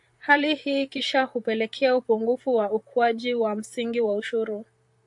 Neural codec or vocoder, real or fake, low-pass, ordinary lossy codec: vocoder, 44.1 kHz, 128 mel bands, Pupu-Vocoder; fake; 10.8 kHz; AAC, 64 kbps